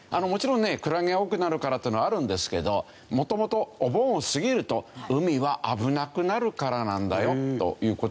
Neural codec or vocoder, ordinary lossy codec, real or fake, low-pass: none; none; real; none